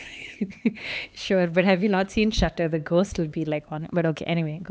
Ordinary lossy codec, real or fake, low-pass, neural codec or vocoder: none; fake; none; codec, 16 kHz, 4 kbps, X-Codec, HuBERT features, trained on LibriSpeech